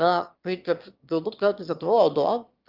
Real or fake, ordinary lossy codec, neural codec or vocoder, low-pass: fake; Opus, 24 kbps; autoencoder, 22.05 kHz, a latent of 192 numbers a frame, VITS, trained on one speaker; 5.4 kHz